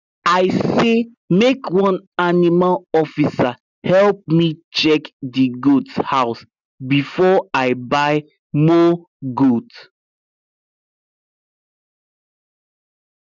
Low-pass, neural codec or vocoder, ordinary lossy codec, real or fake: 7.2 kHz; none; none; real